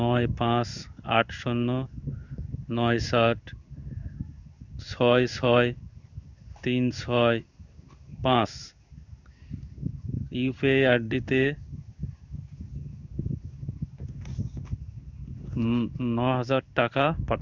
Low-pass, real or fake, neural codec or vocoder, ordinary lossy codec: 7.2 kHz; fake; codec, 16 kHz in and 24 kHz out, 1 kbps, XY-Tokenizer; none